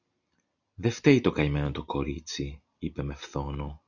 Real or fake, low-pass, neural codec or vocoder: real; 7.2 kHz; none